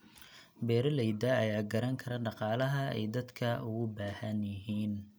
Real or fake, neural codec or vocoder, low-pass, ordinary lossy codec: real; none; none; none